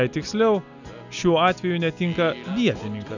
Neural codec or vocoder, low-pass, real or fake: none; 7.2 kHz; real